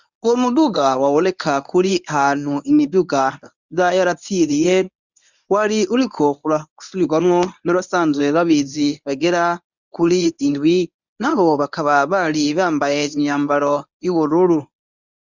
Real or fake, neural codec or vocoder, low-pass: fake; codec, 24 kHz, 0.9 kbps, WavTokenizer, medium speech release version 1; 7.2 kHz